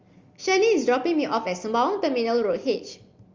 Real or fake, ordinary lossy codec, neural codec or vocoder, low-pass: real; Opus, 32 kbps; none; 7.2 kHz